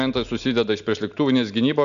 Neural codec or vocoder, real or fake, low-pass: none; real; 7.2 kHz